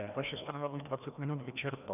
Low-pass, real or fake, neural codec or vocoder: 3.6 kHz; fake; codec, 16 kHz, 1 kbps, FreqCodec, larger model